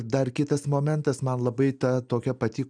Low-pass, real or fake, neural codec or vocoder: 9.9 kHz; real; none